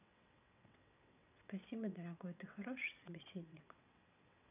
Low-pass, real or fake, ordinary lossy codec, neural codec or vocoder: 3.6 kHz; fake; none; vocoder, 22.05 kHz, 80 mel bands, WaveNeXt